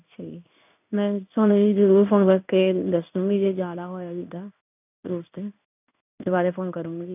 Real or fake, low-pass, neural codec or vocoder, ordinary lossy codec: fake; 3.6 kHz; codec, 16 kHz in and 24 kHz out, 1 kbps, XY-Tokenizer; none